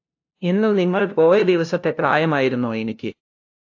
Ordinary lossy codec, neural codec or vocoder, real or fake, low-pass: AAC, 48 kbps; codec, 16 kHz, 0.5 kbps, FunCodec, trained on LibriTTS, 25 frames a second; fake; 7.2 kHz